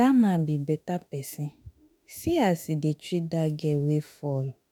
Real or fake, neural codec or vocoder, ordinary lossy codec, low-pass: fake; autoencoder, 48 kHz, 32 numbers a frame, DAC-VAE, trained on Japanese speech; none; none